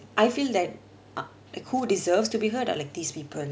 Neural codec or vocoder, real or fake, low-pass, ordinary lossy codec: none; real; none; none